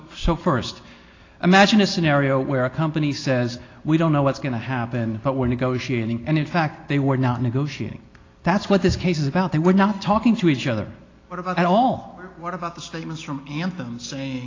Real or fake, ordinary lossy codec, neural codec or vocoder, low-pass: real; AAC, 32 kbps; none; 7.2 kHz